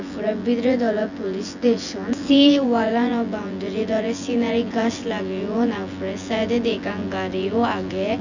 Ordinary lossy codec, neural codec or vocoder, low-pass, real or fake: none; vocoder, 24 kHz, 100 mel bands, Vocos; 7.2 kHz; fake